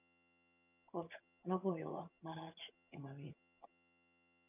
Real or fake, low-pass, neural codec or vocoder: fake; 3.6 kHz; vocoder, 22.05 kHz, 80 mel bands, HiFi-GAN